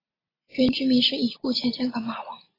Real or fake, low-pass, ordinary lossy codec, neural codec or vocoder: real; 5.4 kHz; AAC, 24 kbps; none